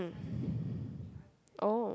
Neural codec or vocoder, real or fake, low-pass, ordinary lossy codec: none; real; none; none